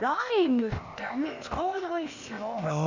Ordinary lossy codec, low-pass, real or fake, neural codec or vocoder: Opus, 64 kbps; 7.2 kHz; fake; codec, 16 kHz, 0.8 kbps, ZipCodec